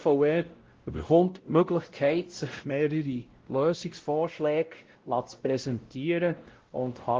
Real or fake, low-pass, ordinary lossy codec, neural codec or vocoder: fake; 7.2 kHz; Opus, 16 kbps; codec, 16 kHz, 0.5 kbps, X-Codec, WavLM features, trained on Multilingual LibriSpeech